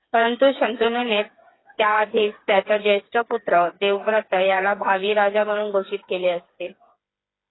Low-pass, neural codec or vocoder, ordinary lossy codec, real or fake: 7.2 kHz; codec, 16 kHz, 4 kbps, FreqCodec, smaller model; AAC, 16 kbps; fake